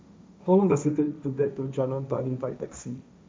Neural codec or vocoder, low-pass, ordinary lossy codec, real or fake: codec, 16 kHz, 1.1 kbps, Voila-Tokenizer; none; none; fake